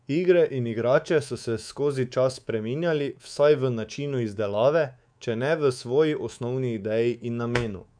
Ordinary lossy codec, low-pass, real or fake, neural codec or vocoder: none; 9.9 kHz; fake; codec, 24 kHz, 3.1 kbps, DualCodec